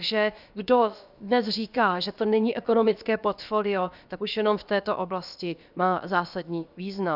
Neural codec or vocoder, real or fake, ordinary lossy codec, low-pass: codec, 16 kHz, about 1 kbps, DyCAST, with the encoder's durations; fake; Opus, 64 kbps; 5.4 kHz